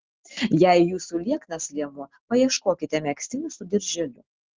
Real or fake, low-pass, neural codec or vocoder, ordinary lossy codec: real; 7.2 kHz; none; Opus, 16 kbps